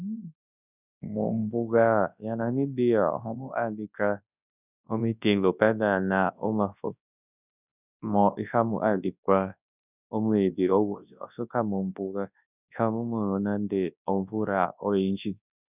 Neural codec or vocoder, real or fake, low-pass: codec, 24 kHz, 0.9 kbps, WavTokenizer, large speech release; fake; 3.6 kHz